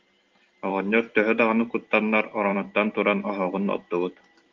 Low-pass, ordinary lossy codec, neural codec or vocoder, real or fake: 7.2 kHz; Opus, 32 kbps; vocoder, 44.1 kHz, 128 mel bands every 512 samples, BigVGAN v2; fake